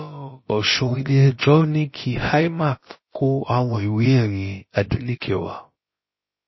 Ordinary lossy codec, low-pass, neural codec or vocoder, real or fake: MP3, 24 kbps; 7.2 kHz; codec, 16 kHz, about 1 kbps, DyCAST, with the encoder's durations; fake